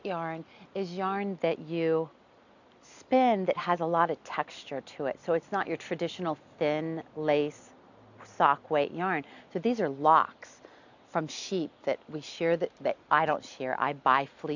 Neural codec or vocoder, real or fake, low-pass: none; real; 7.2 kHz